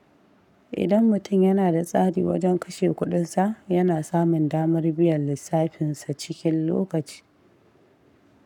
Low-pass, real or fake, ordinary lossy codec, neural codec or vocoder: 19.8 kHz; fake; none; codec, 44.1 kHz, 7.8 kbps, Pupu-Codec